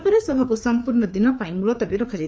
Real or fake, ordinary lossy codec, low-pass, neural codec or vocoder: fake; none; none; codec, 16 kHz, 4 kbps, FreqCodec, larger model